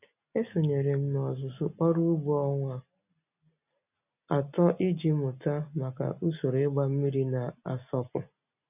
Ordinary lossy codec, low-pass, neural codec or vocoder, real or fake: none; 3.6 kHz; none; real